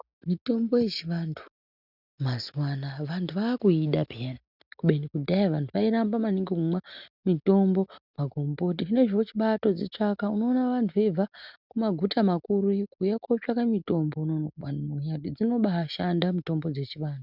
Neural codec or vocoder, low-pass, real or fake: none; 5.4 kHz; real